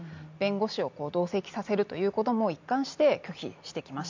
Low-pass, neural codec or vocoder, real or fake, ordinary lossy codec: 7.2 kHz; none; real; MP3, 64 kbps